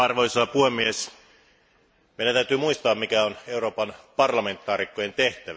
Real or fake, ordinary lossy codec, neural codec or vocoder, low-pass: real; none; none; none